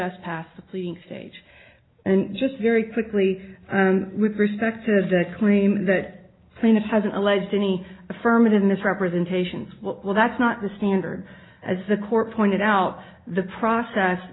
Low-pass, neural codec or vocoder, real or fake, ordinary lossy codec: 7.2 kHz; none; real; AAC, 16 kbps